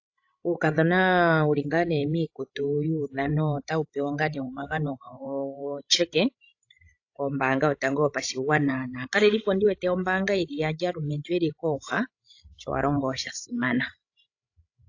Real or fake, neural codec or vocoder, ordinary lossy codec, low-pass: fake; codec, 16 kHz, 16 kbps, FreqCodec, larger model; AAC, 48 kbps; 7.2 kHz